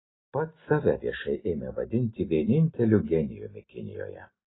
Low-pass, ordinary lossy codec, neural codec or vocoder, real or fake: 7.2 kHz; AAC, 16 kbps; none; real